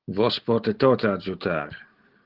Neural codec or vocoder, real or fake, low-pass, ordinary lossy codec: none; real; 5.4 kHz; Opus, 16 kbps